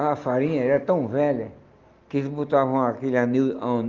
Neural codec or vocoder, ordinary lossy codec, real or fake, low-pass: none; Opus, 32 kbps; real; 7.2 kHz